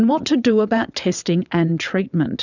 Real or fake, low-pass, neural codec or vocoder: fake; 7.2 kHz; vocoder, 22.05 kHz, 80 mel bands, WaveNeXt